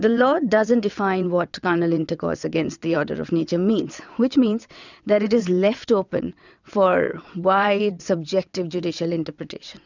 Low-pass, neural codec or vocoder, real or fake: 7.2 kHz; vocoder, 22.05 kHz, 80 mel bands, WaveNeXt; fake